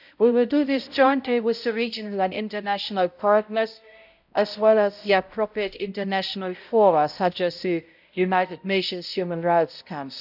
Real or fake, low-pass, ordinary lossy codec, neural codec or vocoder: fake; 5.4 kHz; none; codec, 16 kHz, 0.5 kbps, X-Codec, HuBERT features, trained on balanced general audio